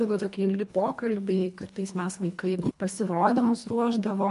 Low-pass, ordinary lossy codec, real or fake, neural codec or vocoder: 10.8 kHz; MP3, 64 kbps; fake; codec, 24 kHz, 1.5 kbps, HILCodec